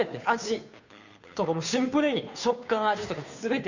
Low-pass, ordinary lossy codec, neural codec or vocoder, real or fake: 7.2 kHz; none; codec, 16 kHz, 2 kbps, FunCodec, trained on Chinese and English, 25 frames a second; fake